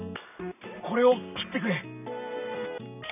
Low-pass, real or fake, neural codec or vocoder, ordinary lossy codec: 3.6 kHz; real; none; none